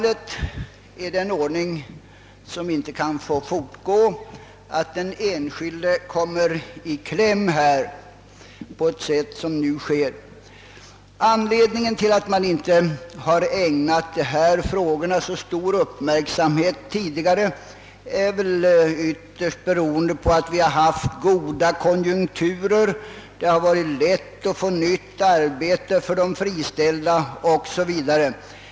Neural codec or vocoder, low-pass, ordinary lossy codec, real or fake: none; none; none; real